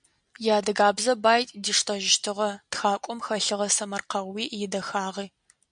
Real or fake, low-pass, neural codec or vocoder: real; 9.9 kHz; none